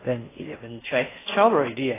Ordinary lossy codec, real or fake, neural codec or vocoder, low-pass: AAC, 16 kbps; fake; codec, 16 kHz in and 24 kHz out, 0.6 kbps, FocalCodec, streaming, 4096 codes; 3.6 kHz